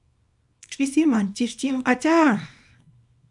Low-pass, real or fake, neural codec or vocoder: 10.8 kHz; fake; codec, 24 kHz, 0.9 kbps, WavTokenizer, small release